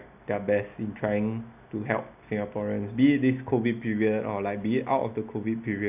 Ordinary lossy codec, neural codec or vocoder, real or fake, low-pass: none; none; real; 3.6 kHz